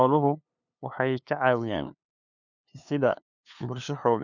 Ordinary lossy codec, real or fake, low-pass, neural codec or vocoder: none; fake; 7.2 kHz; codec, 16 kHz, 2 kbps, FunCodec, trained on LibriTTS, 25 frames a second